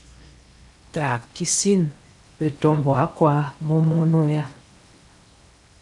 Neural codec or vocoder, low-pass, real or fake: codec, 16 kHz in and 24 kHz out, 0.8 kbps, FocalCodec, streaming, 65536 codes; 10.8 kHz; fake